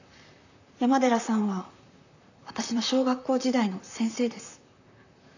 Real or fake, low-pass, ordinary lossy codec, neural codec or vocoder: fake; 7.2 kHz; none; vocoder, 44.1 kHz, 128 mel bands, Pupu-Vocoder